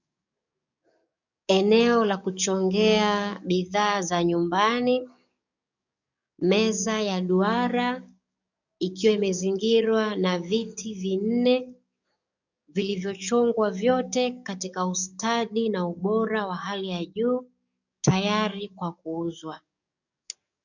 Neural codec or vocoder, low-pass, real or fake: codec, 44.1 kHz, 7.8 kbps, DAC; 7.2 kHz; fake